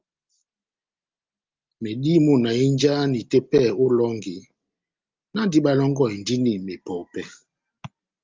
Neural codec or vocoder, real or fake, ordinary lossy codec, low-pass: none; real; Opus, 24 kbps; 7.2 kHz